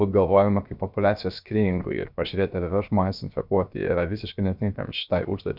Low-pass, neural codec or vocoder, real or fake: 5.4 kHz; codec, 16 kHz, 0.7 kbps, FocalCodec; fake